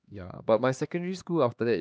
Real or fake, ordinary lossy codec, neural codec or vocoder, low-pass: fake; none; codec, 16 kHz, 2 kbps, X-Codec, HuBERT features, trained on LibriSpeech; none